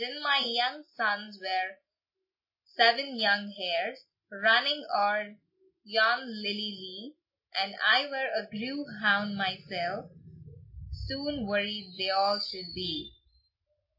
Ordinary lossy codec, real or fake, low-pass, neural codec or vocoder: MP3, 24 kbps; real; 5.4 kHz; none